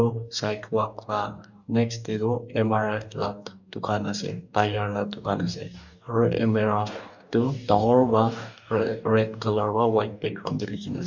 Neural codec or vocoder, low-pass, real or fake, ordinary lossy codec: codec, 44.1 kHz, 2.6 kbps, DAC; 7.2 kHz; fake; none